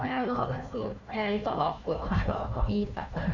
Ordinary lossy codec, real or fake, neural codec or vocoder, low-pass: none; fake; codec, 16 kHz, 1 kbps, FunCodec, trained on Chinese and English, 50 frames a second; 7.2 kHz